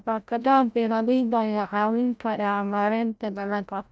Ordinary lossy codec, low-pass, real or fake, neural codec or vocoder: none; none; fake; codec, 16 kHz, 0.5 kbps, FreqCodec, larger model